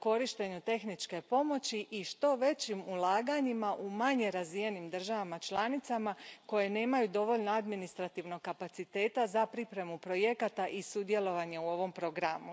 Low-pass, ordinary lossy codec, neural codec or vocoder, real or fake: none; none; none; real